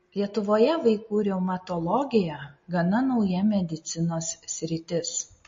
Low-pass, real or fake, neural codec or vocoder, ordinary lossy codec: 7.2 kHz; real; none; MP3, 32 kbps